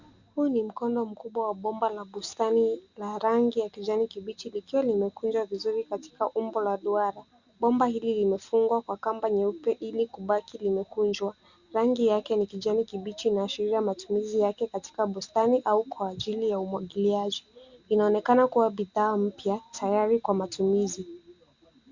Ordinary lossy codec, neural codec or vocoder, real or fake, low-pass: Opus, 64 kbps; none; real; 7.2 kHz